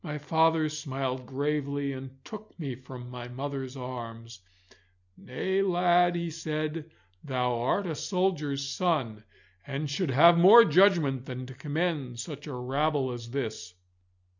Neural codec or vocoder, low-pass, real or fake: none; 7.2 kHz; real